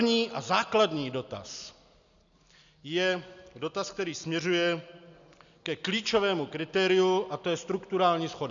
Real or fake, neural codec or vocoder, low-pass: real; none; 7.2 kHz